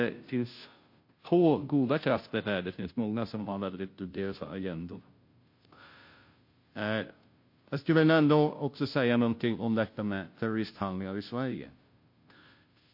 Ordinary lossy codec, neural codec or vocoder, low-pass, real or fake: MP3, 32 kbps; codec, 16 kHz, 0.5 kbps, FunCodec, trained on Chinese and English, 25 frames a second; 5.4 kHz; fake